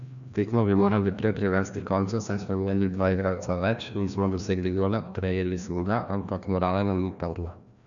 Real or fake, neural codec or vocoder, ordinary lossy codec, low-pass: fake; codec, 16 kHz, 1 kbps, FreqCodec, larger model; none; 7.2 kHz